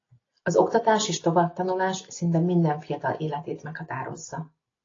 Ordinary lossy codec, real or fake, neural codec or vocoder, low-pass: AAC, 32 kbps; real; none; 7.2 kHz